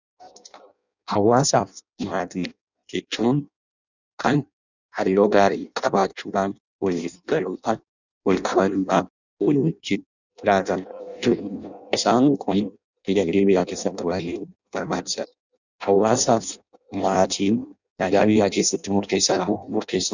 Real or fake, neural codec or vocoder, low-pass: fake; codec, 16 kHz in and 24 kHz out, 0.6 kbps, FireRedTTS-2 codec; 7.2 kHz